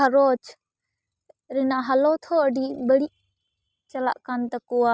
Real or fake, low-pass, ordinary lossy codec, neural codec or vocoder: real; none; none; none